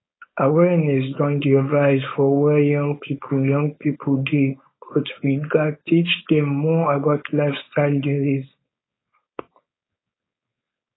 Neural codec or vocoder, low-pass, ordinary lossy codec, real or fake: codec, 16 kHz, 4.8 kbps, FACodec; 7.2 kHz; AAC, 16 kbps; fake